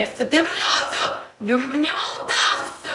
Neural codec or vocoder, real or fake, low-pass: codec, 16 kHz in and 24 kHz out, 0.6 kbps, FocalCodec, streaming, 2048 codes; fake; 10.8 kHz